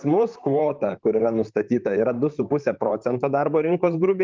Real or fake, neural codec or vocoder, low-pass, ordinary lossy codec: fake; vocoder, 44.1 kHz, 128 mel bands, Pupu-Vocoder; 7.2 kHz; Opus, 24 kbps